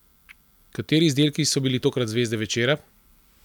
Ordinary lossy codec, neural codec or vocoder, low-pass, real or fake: none; none; 19.8 kHz; real